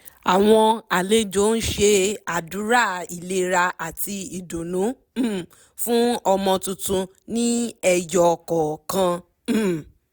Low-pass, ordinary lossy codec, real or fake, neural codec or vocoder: none; none; real; none